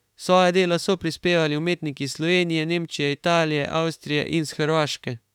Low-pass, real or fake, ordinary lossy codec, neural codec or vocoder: 19.8 kHz; fake; none; autoencoder, 48 kHz, 32 numbers a frame, DAC-VAE, trained on Japanese speech